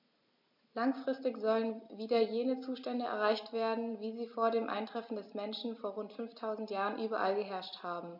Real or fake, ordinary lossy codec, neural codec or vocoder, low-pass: real; MP3, 48 kbps; none; 5.4 kHz